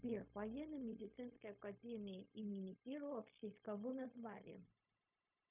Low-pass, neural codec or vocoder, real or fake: 3.6 kHz; codec, 16 kHz, 0.4 kbps, LongCat-Audio-Codec; fake